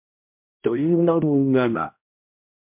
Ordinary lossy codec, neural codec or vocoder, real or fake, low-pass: MP3, 32 kbps; codec, 16 kHz, 0.5 kbps, X-Codec, HuBERT features, trained on balanced general audio; fake; 3.6 kHz